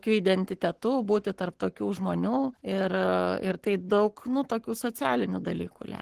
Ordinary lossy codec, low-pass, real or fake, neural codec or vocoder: Opus, 16 kbps; 14.4 kHz; fake; codec, 44.1 kHz, 7.8 kbps, Pupu-Codec